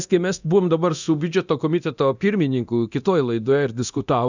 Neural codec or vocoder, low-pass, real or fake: codec, 24 kHz, 0.9 kbps, DualCodec; 7.2 kHz; fake